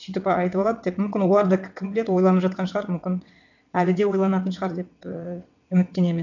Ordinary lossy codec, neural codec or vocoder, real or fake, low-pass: none; vocoder, 22.05 kHz, 80 mel bands, WaveNeXt; fake; 7.2 kHz